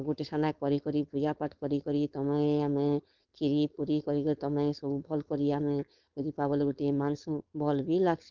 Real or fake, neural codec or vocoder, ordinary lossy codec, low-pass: fake; codec, 16 kHz, 4.8 kbps, FACodec; Opus, 32 kbps; 7.2 kHz